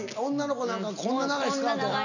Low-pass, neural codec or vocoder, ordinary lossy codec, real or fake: 7.2 kHz; none; none; real